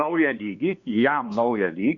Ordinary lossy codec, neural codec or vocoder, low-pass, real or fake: Opus, 64 kbps; codec, 16 kHz, 2 kbps, X-Codec, WavLM features, trained on Multilingual LibriSpeech; 7.2 kHz; fake